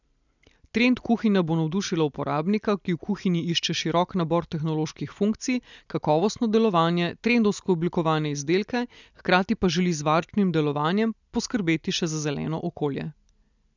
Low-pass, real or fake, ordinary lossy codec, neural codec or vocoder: 7.2 kHz; real; none; none